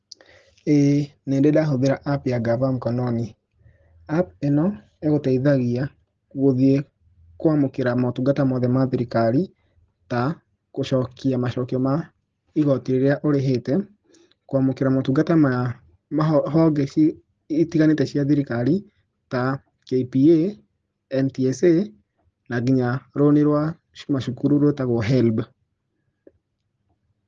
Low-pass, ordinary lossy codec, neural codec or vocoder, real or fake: 7.2 kHz; Opus, 16 kbps; none; real